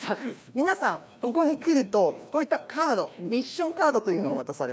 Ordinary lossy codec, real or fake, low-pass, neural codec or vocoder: none; fake; none; codec, 16 kHz, 1 kbps, FreqCodec, larger model